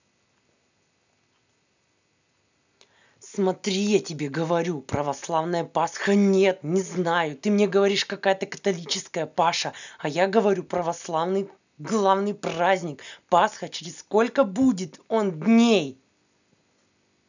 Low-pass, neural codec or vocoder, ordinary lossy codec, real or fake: 7.2 kHz; none; none; real